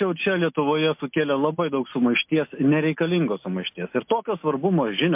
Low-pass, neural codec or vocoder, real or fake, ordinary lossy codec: 3.6 kHz; none; real; MP3, 32 kbps